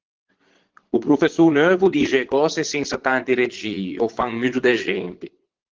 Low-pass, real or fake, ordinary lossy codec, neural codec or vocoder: 7.2 kHz; fake; Opus, 16 kbps; vocoder, 22.05 kHz, 80 mel bands, Vocos